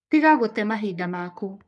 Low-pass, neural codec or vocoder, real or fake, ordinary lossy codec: 10.8 kHz; codec, 44.1 kHz, 3.4 kbps, Pupu-Codec; fake; none